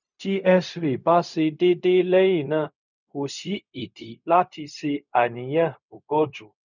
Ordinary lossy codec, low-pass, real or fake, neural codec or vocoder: none; 7.2 kHz; fake; codec, 16 kHz, 0.4 kbps, LongCat-Audio-Codec